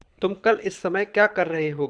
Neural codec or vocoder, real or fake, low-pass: codec, 24 kHz, 6 kbps, HILCodec; fake; 9.9 kHz